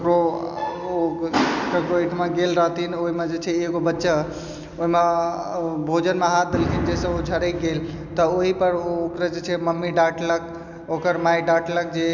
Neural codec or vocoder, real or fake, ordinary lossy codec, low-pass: none; real; none; 7.2 kHz